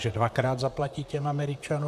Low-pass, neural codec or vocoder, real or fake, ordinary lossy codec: 14.4 kHz; vocoder, 44.1 kHz, 128 mel bands, Pupu-Vocoder; fake; AAC, 96 kbps